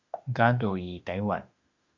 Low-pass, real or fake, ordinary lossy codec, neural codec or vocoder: 7.2 kHz; fake; Opus, 64 kbps; autoencoder, 48 kHz, 32 numbers a frame, DAC-VAE, trained on Japanese speech